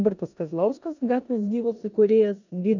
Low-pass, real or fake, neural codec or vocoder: 7.2 kHz; fake; codec, 16 kHz in and 24 kHz out, 0.9 kbps, LongCat-Audio-Codec, four codebook decoder